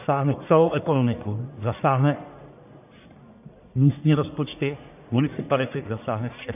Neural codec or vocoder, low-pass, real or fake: codec, 44.1 kHz, 1.7 kbps, Pupu-Codec; 3.6 kHz; fake